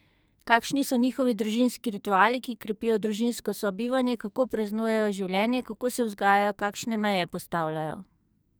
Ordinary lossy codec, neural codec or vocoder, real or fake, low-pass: none; codec, 44.1 kHz, 2.6 kbps, SNAC; fake; none